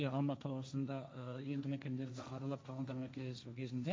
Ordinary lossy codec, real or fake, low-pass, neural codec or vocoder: none; fake; none; codec, 16 kHz, 1.1 kbps, Voila-Tokenizer